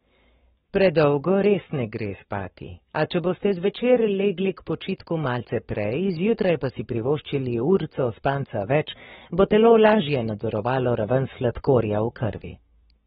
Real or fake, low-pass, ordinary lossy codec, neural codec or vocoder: fake; 19.8 kHz; AAC, 16 kbps; codec, 44.1 kHz, 7.8 kbps, Pupu-Codec